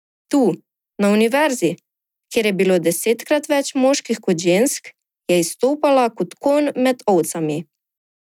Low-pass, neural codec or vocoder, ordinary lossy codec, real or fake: 19.8 kHz; none; none; real